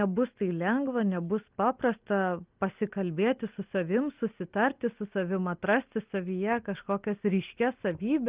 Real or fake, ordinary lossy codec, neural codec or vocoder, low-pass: real; Opus, 32 kbps; none; 3.6 kHz